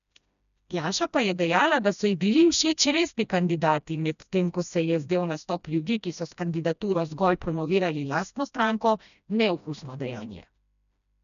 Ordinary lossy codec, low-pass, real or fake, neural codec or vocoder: none; 7.2 kHz; fake; codec, 16 kHz, 1 kbps, FreqCodec, smaller model